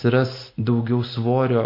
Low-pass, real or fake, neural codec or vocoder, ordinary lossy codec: 5.4 kHz; real; none; MP3, 32 kbps